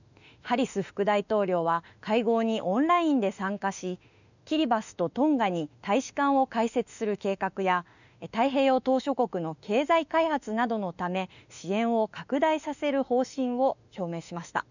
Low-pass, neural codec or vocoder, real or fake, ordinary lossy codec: 7.2 kHz; autoencoder, 48 kHz, 32 numbers a frame, DAC-VAE, trained on Japanese speech; fake; none